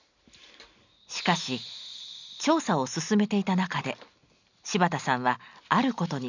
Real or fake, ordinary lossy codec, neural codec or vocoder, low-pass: real; none; none; 7.2 kHz